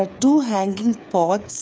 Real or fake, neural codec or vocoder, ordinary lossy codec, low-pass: fake; codec, 16 kHz, 8 kbps, FreqCodec, smaller model; none; none